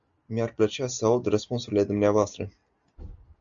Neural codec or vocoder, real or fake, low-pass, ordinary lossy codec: none; real; 7.2 kHz; AAC, 64 kbps